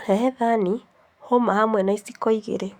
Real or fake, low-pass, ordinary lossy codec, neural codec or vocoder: fake; 19.8 kHz; Opus, 64 kbps; autoencoder, 48 kHz, 128 numbers a frame, DAC-VAE, trained on Japanese speech